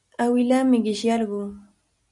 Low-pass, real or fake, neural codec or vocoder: 10.8 kHz; real; none